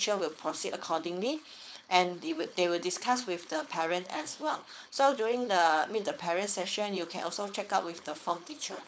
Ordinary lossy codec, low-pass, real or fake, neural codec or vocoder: none; none; fake; codec, 16 kHz, 4.8 kbps, FACodec